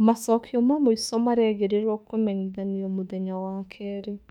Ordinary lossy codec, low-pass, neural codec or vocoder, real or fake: none; 19.8 kHz; autoencoder, 48 kHz, 32 numbers a frame, DAC-VAE, trained on Japanese speech; fake